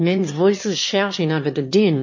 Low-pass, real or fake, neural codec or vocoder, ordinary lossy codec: 7.2 kHz; fake; autoencoder, 22.05 kHz, a latent of 192 numbers a frame, VITS, trained on one speaker; MP3, 32 kbps